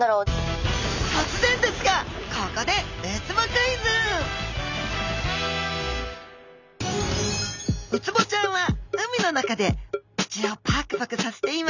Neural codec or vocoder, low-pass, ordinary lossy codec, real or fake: none; 7.2 kHz; none; real